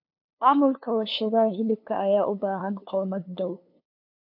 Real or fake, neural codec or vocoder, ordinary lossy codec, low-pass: fake; codec, 16 kHz, 2 kbps, FunCodec, trained on LibriTTS, 25 frames a second; AAC, 48 kbps; 5.4 kHz